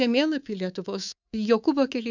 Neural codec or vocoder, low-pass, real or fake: codec, 24 kHz, 3.1 kbps, DualCodec; 7.2 kHz; fake